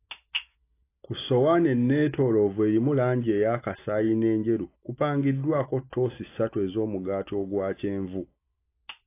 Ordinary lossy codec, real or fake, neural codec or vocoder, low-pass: AAC, 24 kbps; real; none; 3.6 kHz